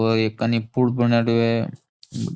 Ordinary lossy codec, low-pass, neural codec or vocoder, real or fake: none; none; none; real